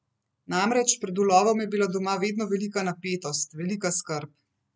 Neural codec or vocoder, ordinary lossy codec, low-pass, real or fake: none; none; none; real